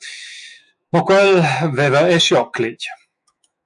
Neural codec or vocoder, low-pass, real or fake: autoencoder, 48 kHz, 128 numbers a frame, DAC-VAE, trained on Japanese speech; 10.8 kHz; fake